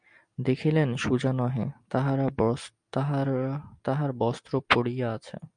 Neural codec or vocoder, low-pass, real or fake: none; 9.9 kHz; real